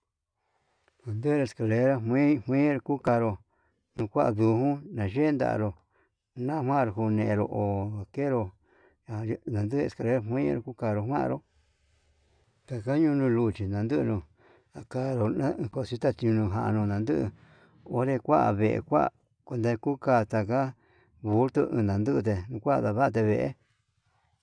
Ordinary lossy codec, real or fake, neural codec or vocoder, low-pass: none; real; none; 9.9 kHz